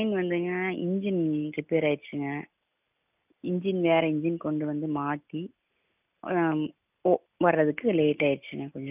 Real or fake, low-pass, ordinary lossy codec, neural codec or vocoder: real; 3.6 kHz; none; none